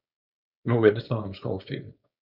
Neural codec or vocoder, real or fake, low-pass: codec, 16 kHz, 4.8 kbps, FACodec; fake; 5.4 kHz